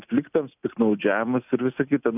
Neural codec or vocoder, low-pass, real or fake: none; 3.6 kHz; real